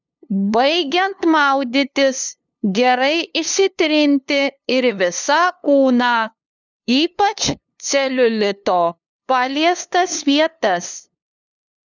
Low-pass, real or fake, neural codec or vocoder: 7.2 kHz; fake; codec, 16 kHz, 2 kbps, FunCodec, trained on LibriTTS, 25 frames a second